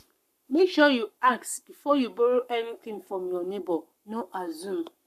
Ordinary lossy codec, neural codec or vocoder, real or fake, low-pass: none; codec, 44.1 kHz, 7.8 kbps, Pupu-Codec; fake; 14.4 kHz